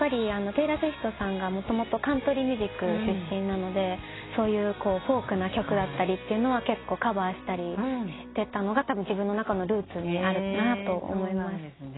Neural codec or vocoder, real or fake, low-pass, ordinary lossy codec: none; real; 7.2 kHz; AAC, 16 kbps